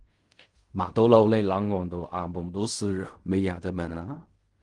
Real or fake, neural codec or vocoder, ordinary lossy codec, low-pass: fake; codec, 16 kHz in and 24 kHz out, 0.4 kbps, LongCat-Audio-Codec, fine tuned four codebook decoder; Opus, 24 kbps; 10.8 kHz